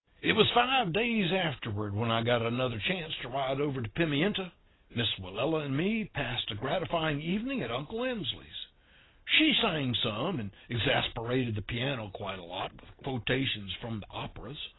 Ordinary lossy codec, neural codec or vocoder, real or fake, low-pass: AAC, 16 kbps; none; real; 7.2 kHz